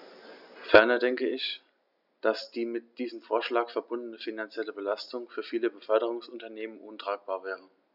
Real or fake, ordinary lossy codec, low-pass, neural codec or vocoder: real; none; 5.4 kHz; none